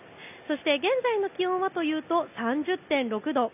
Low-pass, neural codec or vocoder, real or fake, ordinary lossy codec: 3.6 kHz; none; real; none